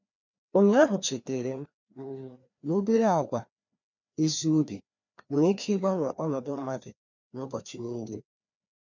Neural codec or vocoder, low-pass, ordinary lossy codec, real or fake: codec, 16 kHz, 2 kbps, FreqCodec, larger model; 7.2 kHz; none; fake